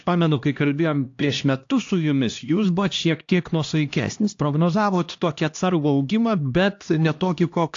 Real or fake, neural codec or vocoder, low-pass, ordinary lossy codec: fake; codec, 16 kHz, 1 kbps, X-Codec, HuBERT features, trained on LibriSpeech; 7.2 kHz; AAC, 48 kbps